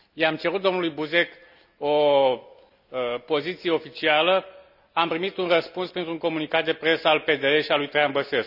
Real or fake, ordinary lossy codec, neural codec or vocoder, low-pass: real; none; none; 5.4 kHz